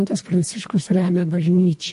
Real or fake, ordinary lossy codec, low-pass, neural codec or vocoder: fake; MP3, 48 kbps; 10.8 kHz; codec, 24 kHz, 1.5 kbps, HILCodec